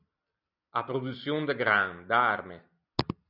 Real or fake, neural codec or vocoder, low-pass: real; none; 5.4 kHz